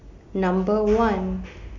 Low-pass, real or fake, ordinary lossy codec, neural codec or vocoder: 7.2 kHz; real; MP3, 64 kbps; none